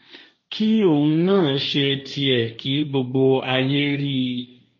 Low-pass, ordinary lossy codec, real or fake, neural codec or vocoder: 7.2 kHz; MP3, 32 kbps; fake; codec, 16 kHz, 1.1 kbps, Voila-Tokenizer